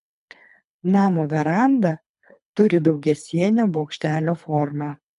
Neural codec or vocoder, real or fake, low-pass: codec, 24 kHz, 3 kbps, HILCodec; fake; 10.8 kHz